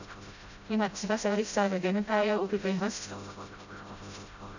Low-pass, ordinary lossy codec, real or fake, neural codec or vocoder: 7.2 kHz; none; fake; codec, 16 kHz, 0.5 kbps, FreqCodec, smaller model